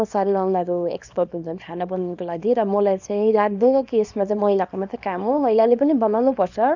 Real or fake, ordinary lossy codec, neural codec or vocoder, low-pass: fake; none; codec, 24 kHz, 0.9 kbps, WavTokenizer, small release; 7.2 kHz